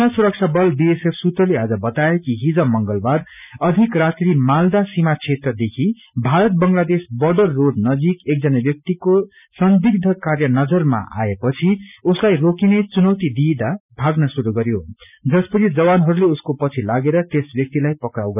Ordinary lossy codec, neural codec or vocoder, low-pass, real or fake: none; none; 3.6 kHz; real